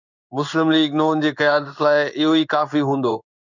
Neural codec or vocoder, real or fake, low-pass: codec, 16 kHz in and 24 kHz out, 1 kbps, XY-Tokenizer; fake; 7.2 kHz